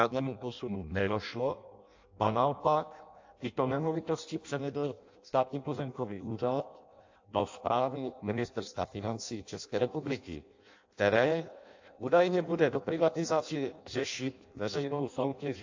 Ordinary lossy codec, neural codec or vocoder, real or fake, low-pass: AAC, 48 kbps; codec, 16 kHz in and 24 kHz out, 0.6 kbps, FireRedTTS-2 codec; fake; 7.2 kHz